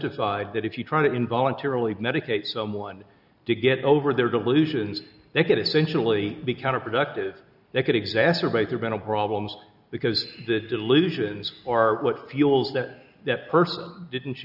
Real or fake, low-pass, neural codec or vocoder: real; 5.4 kHz; none